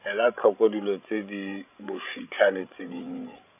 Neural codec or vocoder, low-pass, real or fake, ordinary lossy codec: codec, 16 kHz, 16 kbps, FreqCodec, larger model; 3.6 kHz; fake; none